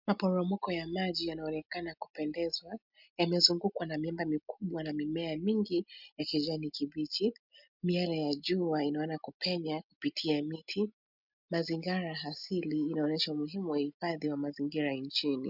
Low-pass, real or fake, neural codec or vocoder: 5.4 kHz; real; none